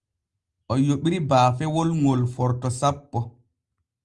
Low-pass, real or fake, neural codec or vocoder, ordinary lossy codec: 10.8 kHz; real; none; Opus, 32 kbps